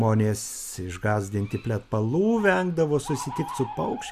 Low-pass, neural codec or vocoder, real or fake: 14.4 kHz; none; real